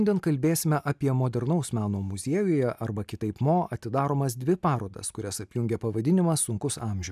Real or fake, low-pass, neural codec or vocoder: real; 14.4 kHz; none